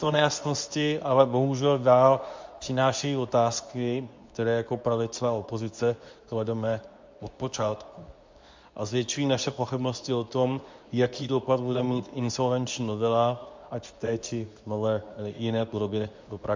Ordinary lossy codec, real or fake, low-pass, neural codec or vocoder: MP3, 64 kbps; fake; 7.2 kHz; codec, 24 kHz, 0.9 kbps, WavTokenizer, medium speech release version 2